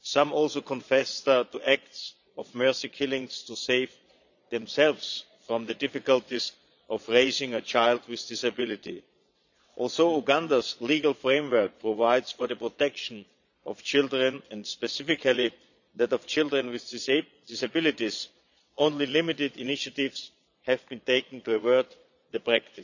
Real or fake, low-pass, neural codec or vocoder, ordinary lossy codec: fake; 7.2 kHz; vocoder, 22.05 kHz, 80 mel bands, Vocos; none